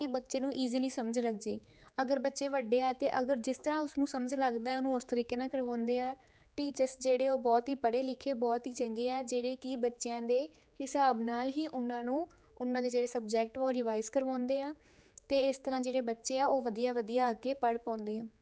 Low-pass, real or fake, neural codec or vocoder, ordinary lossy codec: none; fake; codec, 16 kHz, 4 kbps, X-Codec, HuBERT features, trained on general audio; none